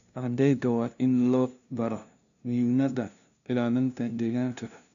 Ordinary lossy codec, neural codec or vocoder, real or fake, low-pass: none; codec, 16 kHz, 0.5 kbps, FunCodec, trained on LibriTTS, 25 frames a second; fake; 7.2 kHz